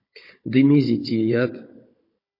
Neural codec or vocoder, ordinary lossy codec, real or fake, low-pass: codec, 16 kHz, 16 kbps, FunCodec, trained on Chinese and English, 50 frames a second; MP3, 32 kbps; fake; 5.4 kHz